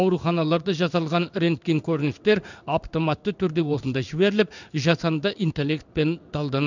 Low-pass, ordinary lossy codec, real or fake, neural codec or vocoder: 7.2 kHz; none; fake; codec, 16 kHz in and 24 kHz out, 1 kbps, XY-Tokenizer